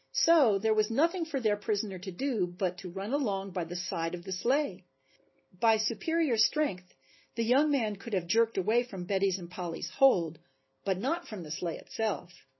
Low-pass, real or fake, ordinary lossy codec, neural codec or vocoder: 7.2 kHz; real; MP3, 24 kbps; none